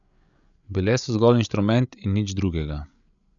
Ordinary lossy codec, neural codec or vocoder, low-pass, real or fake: none; codec, 16 kHz, 8 kbps, FreqCodec, larger model; 7.2 kHz; fake